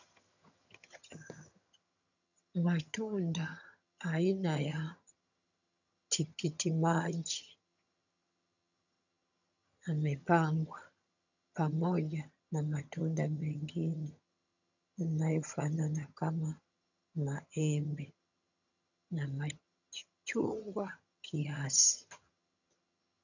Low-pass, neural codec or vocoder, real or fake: 7.2 kHz; vocoder, 22.05 kHz, 80 mel bands, HiFi-GAN; fake